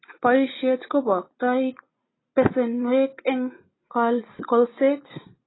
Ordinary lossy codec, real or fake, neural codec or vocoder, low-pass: AAC, 16 kbps; real; none; 7.2 kHz